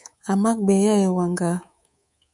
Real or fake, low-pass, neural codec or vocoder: fake; 10.8 kHz; codec, 24 kHz, 3.1 kbps, DualCodec